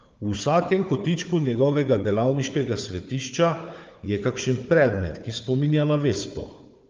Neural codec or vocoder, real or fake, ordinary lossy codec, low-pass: codec, 16 kHz, 4 kbps, FunCodec, trained on Chinese and English, 50 frames a second; fake; Opus, 24 kbps; 7.2 kHz